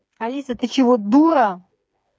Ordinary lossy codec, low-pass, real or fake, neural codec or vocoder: none; none; fake; codec, 16 kHz, 4 kbps, FreqCodec, smaller model